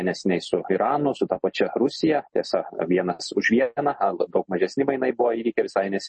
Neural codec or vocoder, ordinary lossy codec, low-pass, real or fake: none; MP3, 32 kbps; 10.8 kHz; real